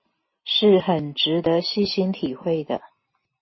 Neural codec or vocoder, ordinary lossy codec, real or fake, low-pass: none; MP3, 24 kbps; real; 7.2 kHz